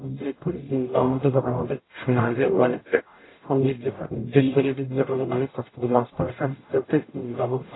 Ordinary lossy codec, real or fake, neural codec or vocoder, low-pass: AAC, 16 kbps; fake; codec, 44.1 kHz, 0.9 kbps, DAC; 7.2 kHz